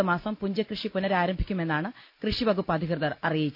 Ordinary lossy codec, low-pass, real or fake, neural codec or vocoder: none; 5.4 kHz; real; none